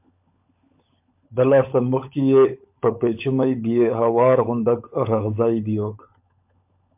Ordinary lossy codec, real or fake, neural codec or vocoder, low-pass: MP3, 32 kbps; fake; codec, 16 kHz, 16 kbps, FunCodec, trained on LibriTTS, 50 frames a second; 3.6 kHz